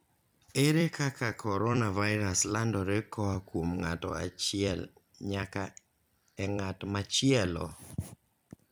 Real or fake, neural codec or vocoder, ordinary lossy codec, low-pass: fake; vocoder, 44.1 kHz, 128 mel bands every 256 samples, BigVGAN v2; none; none